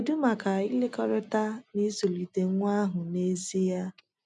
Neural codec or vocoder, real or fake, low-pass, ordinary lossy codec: none; real; 10.8 kHz; none